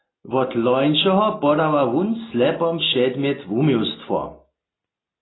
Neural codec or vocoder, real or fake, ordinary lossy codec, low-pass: none; real; AAC, 16 kbps; 7.2 kHz